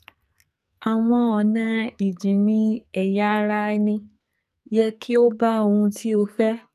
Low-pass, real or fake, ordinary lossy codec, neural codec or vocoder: 14.4 kHz; fake; none; codec, 44.1 kHz, 2.6 kbps, SNAC